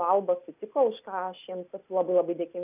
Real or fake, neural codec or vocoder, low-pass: real; none; 3.6 kHz